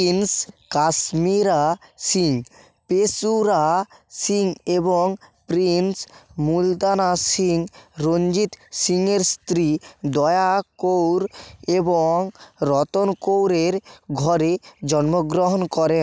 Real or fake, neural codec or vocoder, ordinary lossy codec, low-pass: real; none; none; none